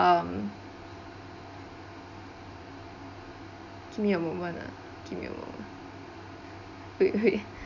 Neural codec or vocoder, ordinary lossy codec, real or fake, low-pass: none; none; real; 7.2 kHz